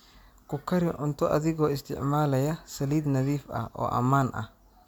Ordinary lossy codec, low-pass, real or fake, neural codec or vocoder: MP3, 96 kbps; 19.8 kHz; real; none